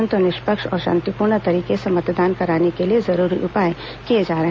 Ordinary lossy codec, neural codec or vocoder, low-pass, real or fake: none; none; none; real